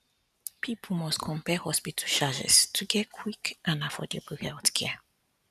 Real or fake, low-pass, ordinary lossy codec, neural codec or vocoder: real; 14.4 kHz; none; none